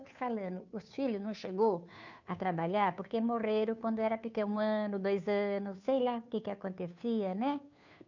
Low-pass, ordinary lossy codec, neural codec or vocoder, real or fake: 7.2 kHz; none; codec, 16 kHz, 2 kbps, FunCodec, trained on Chinese and English, 25 frames a second; fake